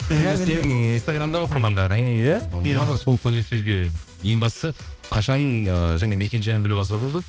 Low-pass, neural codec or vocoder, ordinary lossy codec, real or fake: none; codec, 16 kHz, 1 kbps, X-Codec, HuBERT features, trained on balanced general audio; none; fake